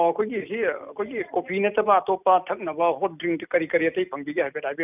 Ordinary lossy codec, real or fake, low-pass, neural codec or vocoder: none; real; 3.6 kHz; none